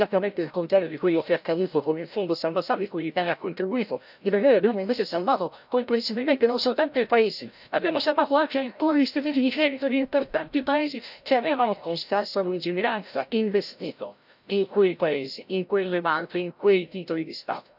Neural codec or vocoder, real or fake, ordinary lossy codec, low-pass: codec, 16 kHz, 0.5 kbps, FreqCodec, larger model; fake; none; 5.4 kHz